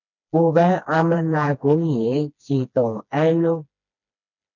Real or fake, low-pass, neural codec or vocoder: fake; 7.2 kHz; codec, 16 kHz, 2 kbps, FreqCodec, smaller model